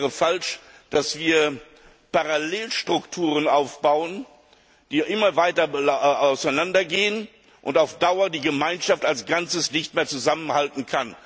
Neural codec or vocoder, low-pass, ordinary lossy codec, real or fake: none; none; none; real